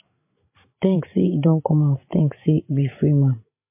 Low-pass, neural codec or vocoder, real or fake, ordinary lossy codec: 3.6 kHz; codec, 16 kHz, 16 kbps, FreqCodec, larger model; fake; MP3, 24 kbps